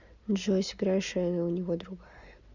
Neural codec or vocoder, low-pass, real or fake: none; 7.2 kHz; real